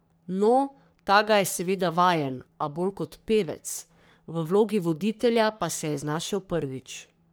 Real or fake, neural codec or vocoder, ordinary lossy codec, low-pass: fake; codec, 44.1 kHz, 3.4 kbps, Pupu-Codec; none; none